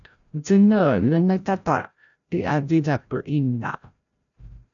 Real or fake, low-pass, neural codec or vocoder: fake; 7.2 kHz; codec, 16 kHz, 0.5 kbps, FreqCodec, larger model